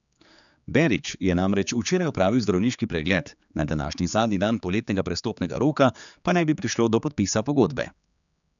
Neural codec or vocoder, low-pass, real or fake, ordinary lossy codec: codec, 16 kHz, 4 kbps, X-Codec, HuBERT features, trained on general audio; 7.2 kHz; fake; none